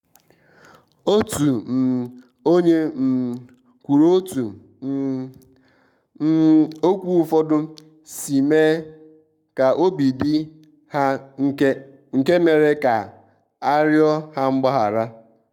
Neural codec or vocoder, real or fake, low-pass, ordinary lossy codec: codec, 44.1 kHz, 7.8 kbps, Pupu-Codec; fake; 19.8 kHz; none